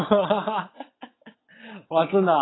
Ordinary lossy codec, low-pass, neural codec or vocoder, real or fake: AAC, 16 kbps; 7.2 kHz; vocoder, 22.05 kHz, 80 mel bands, WaveNeXt; fake